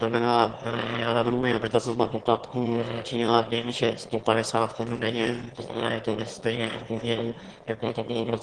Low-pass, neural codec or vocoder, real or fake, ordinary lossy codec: 9.9 kHz; autoencoder, 22.05 kHz, a latent of 192 numbers a frame, VITS, trained on one speaker; fake; Opus, 16 kbps